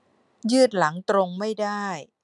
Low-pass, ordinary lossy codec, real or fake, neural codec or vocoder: 10.8 kHz; none; real; none